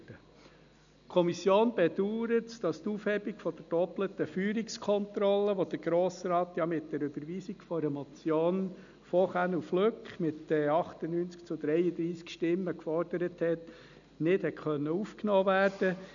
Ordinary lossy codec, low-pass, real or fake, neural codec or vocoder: none; 7.2 kHz; real; none